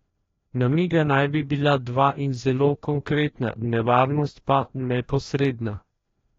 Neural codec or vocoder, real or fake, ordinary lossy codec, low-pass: codec, 16 kHz, 1 kbps, FreqCodec, larger model; fake; AAC, 32 kbps; 7.2 kHz